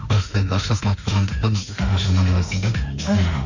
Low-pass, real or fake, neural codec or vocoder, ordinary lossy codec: 7.2 kHz; fake; autoencoder, 48 kHz, 32 numbers a frame, DAC-VAE, trained on Japanese speech; none